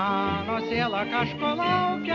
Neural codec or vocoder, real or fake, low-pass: none; real; 7.2 kHz